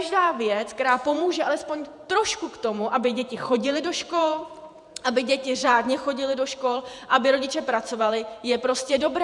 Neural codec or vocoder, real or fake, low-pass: vocoder, 48 kHz, 128 mel bands, Vocos; fake; 10.8 kHz